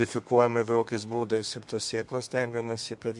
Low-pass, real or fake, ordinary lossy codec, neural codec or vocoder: 10.8 kHz; fake; MP3, 64 kbps; codec, 32 kHz, 1.9 kbps, SNAC